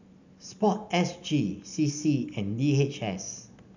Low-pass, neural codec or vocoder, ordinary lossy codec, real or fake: 7.2 kHz; none; none; real